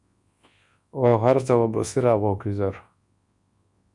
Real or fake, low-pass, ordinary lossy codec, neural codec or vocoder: fake; 10.8 kHz; Opus, 64 kbps; codec, 24 kHz, 0.9 kbps, WavTokenizer, large speech release